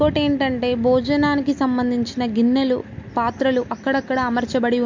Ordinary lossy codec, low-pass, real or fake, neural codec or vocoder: MP3, 48 kbps; 7.2 kHz; real; none